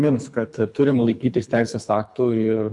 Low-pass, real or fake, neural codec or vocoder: 10.8 kHz; fake; codec, 24 kHz, 3 kbps, HILCodec